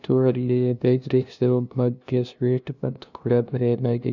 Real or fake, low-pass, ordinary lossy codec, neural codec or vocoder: fake; 7.2 kHz; none; codec, 16 kHz, 0.5 kbps, FunCodec, trained on LibriTTS, 25 frames a second